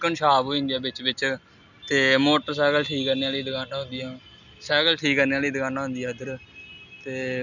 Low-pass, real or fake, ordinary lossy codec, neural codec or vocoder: 7.2 kHz; real; none; none